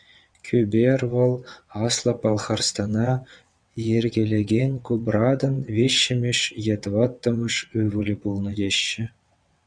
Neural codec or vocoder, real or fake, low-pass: vocoder, 22.05 kHz, 80 mel bands, WaveNeXt; fake; 9.9 kHz